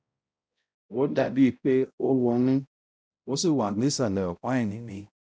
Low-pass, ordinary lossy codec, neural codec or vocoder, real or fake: none; none; codec, 16 kHz, 0.5 kbps, X-Codec, HuBERT features, trained on balanced general audio; fake